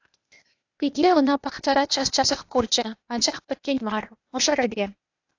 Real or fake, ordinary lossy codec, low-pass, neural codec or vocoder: fake; AAC, 48 kbps; 7.2 kHz; codec, 16 kHz, 0.8 kbps, ZipCodec